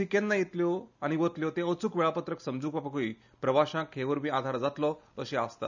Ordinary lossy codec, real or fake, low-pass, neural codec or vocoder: none; real; 7.2 kHz; none